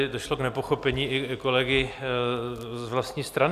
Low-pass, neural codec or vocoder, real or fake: 14.4 kHz; none; real